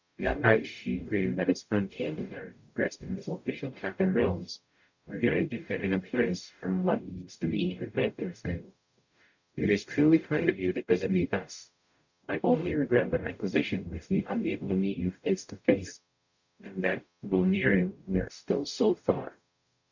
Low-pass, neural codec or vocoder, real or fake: 7.2 kHz; codec, 44.1 kHz, 0.9 kbps, DAC; fake